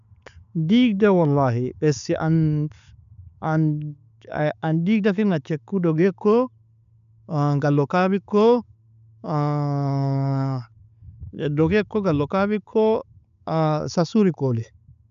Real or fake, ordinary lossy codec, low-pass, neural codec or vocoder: real; none; 7.2 kHz; none